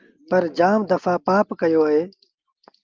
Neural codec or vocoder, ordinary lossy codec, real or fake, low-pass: none; Opus, 32 kbps; real; 7.2 kHz